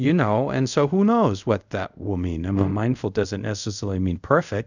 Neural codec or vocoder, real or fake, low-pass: codec, 24 kHz, 0.5 kbps, DualCodec; fake; 7.2 kHz